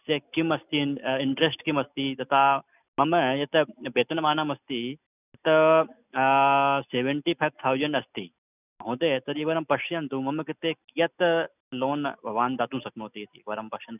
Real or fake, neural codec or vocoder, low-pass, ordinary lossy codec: real; none; 3.6 kHz; none